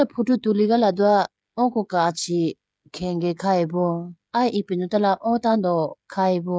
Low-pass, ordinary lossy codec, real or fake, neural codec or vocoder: none; none; fake; codec, 16 kHz, 16 kbps, FreqCodec, smaller model